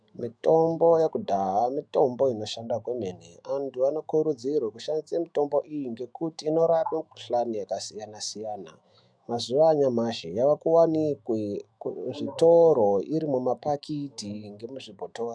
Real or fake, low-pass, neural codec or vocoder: fake; 9.9 kHz; autoencoder, 48 kHz, 128 numbers a frame, DAC-VAE, trained on Japanese speech